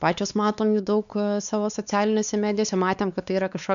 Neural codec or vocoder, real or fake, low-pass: codec, 16 kHz, 4 kbps, X-Codec, WavLM features, trained on Multilingual LibriSpeech; fake; 7.2 kHz